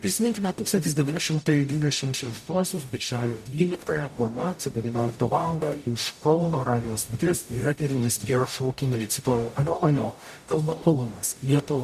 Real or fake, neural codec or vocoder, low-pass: fake; codec, 44.1 kHz, 0.9 kbps, DAC; 14.4 kHz